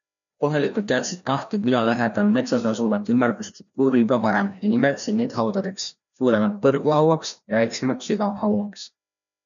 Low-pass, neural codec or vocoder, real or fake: 7.2 kHz; codec, 16 kHz, 1 kbps, FreqCodec, larger model; fake